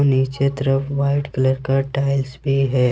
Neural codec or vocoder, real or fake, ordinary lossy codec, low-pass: none; real; none; none